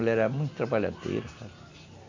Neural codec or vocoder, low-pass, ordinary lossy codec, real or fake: none; 7.2 kHz; AAC, 48 kbps; real